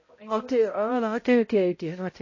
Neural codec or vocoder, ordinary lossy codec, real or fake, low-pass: codec, 16 kHz, 0.5 kbps, X-Codec, HuBERT features, trained on balanced general audio; MP3, 32 kbps; fake; 7.2 kHz